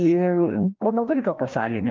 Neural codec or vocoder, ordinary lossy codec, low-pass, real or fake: codec, 16 kHz, 1 kbps, FreqCodec, larger model; Opus, 32 kbps; 7.2 kHz; fake